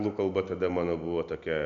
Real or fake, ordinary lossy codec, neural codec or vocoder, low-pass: real; MP3, 64 kbps; none; 7.2 kHz